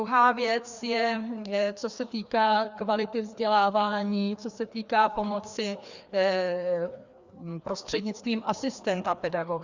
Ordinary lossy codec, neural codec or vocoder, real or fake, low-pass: Opus, 64 kbps; codec, 16 kHz, 2 kbps, FreqCodec, larger model; fake; 7.2 kHz